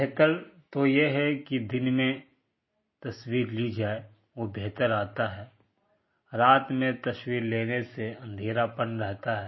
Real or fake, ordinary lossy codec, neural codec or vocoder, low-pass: real; MP3, 24 kbps; none; 7.2 kHz